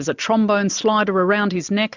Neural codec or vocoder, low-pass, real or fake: none; 7.2 kHz; real